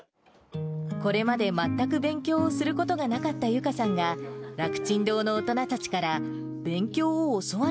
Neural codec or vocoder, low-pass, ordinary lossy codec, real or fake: none; none; none; real